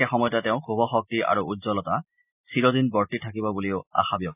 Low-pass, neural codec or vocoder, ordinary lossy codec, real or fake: 3.6 kHz; none; none; real